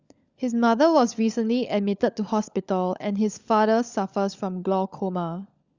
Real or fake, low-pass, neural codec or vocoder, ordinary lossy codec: fake; 7.2 kHz; codec, 16 kHz, 16 kbps, FunCodec, trained on LibriTTS, 50 frames a second; Opus, 64 kbps